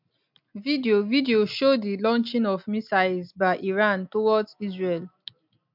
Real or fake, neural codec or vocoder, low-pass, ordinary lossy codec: real; none; 5.4 kHz; MP3, 48 kbps